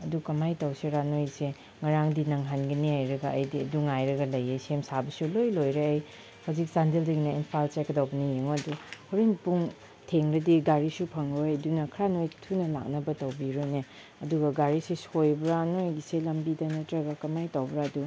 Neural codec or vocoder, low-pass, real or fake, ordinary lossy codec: none; none; real; none